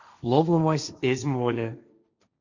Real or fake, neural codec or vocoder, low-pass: fake; codec, 16 kHz, 1.1 kbps, Voila-Tokenizer; 7.2 kHz